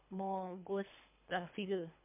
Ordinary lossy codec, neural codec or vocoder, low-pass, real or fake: MP3, 32 kbps; codec, 24 kHz, 3 kbps, HILCodec; 3.6 kHz; fake